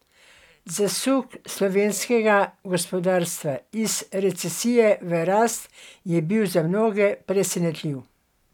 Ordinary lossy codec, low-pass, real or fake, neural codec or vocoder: none; 19.8 kHz; real; none